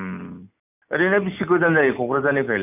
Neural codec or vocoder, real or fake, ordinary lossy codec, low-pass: none; real; none; 3.6 kHz